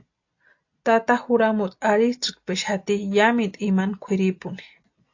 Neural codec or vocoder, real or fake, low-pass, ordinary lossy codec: none; real; 7.2 kHz; AAC, 48 kbps